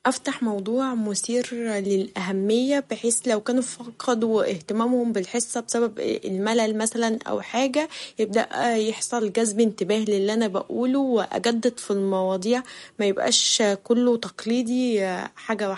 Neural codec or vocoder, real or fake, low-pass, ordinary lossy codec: none; real; 19.8 kHz; MP3, 48 kbps